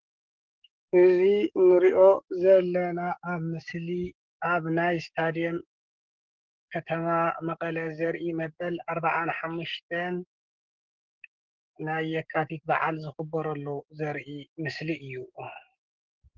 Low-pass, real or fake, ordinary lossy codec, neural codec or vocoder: 7.2 kHz; fake; Opus, 16 kbps; codec, 44.1 kHz, 7.8 kbps, DAC